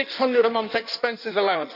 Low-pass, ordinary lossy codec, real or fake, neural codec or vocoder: 5.4 kHz; none; fake; codec, 16 kHz in and 24 kHz out, 1.1 kbps, FireRedTTS-2 codec